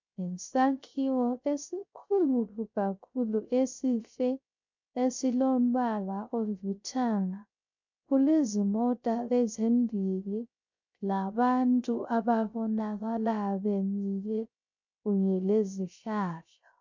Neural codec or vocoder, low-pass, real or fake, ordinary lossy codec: codec, 16 kHz, 0.3 kbps, FocalCodec; 7.2 kHz; fake; MP3, 64 kbps